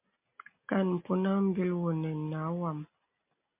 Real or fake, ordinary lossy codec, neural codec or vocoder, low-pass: real; MP3, 32 kbps; none; 3.6 kHz